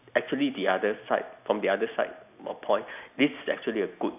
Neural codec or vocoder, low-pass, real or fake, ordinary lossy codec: none; 3.6 kHz; real; none